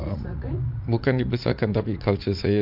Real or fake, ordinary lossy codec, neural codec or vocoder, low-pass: fake; MP3, 48 kbps; vocoder, 44.1 kHz, 80 mel bands, Vocos; 5.4 kHz